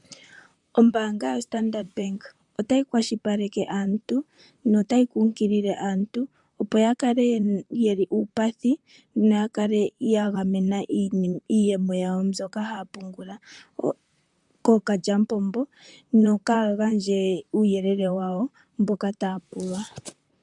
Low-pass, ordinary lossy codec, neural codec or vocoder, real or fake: 10.8 kHz; MP3, 96 kbps; vocoder, 44.1 kHz, 128 mel bands, Pupu-Vocoder; fake